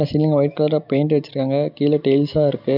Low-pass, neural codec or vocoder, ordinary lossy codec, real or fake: 5.4 kHz; none; none; real